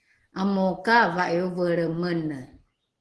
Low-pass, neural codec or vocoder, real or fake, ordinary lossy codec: 10.8 kHz; none; real; Opus, 16 kbps